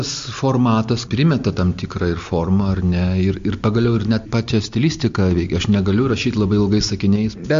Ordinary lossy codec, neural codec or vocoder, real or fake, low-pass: MP3, 48 kbps; none; real; 7.2 kHz